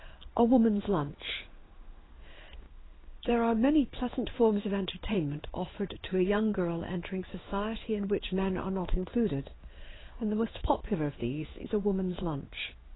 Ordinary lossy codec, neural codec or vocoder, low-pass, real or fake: AAC, 16 kbps; codec, 16 kHz in and 24 kHz out, 1 kbps, XY-Tokenizer; 7.2 kHz; fake